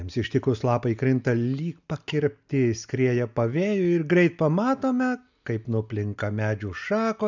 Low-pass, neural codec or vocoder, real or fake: 7.2 kHz; none; real